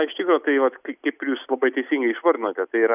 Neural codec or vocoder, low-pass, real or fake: none; 3.6 kHz; real